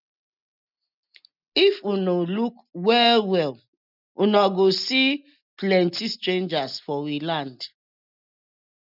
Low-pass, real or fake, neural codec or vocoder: 5.4 kHz; real; none